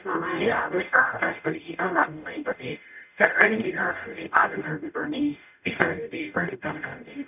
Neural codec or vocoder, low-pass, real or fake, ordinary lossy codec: codec, 44.1 kHz, 0.9 kbps, DAC; 3.6 kHz; fake; none